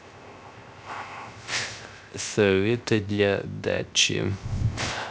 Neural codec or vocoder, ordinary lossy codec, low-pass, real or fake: codec, 16 kHz, 0.3 kbps, FocalCodec; none; none; fake